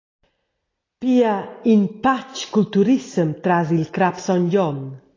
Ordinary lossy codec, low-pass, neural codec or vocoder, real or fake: AAC, 32 kbps; 7.2 kHz; none; real